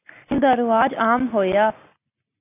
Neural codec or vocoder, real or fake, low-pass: none; real; 3.6 kHz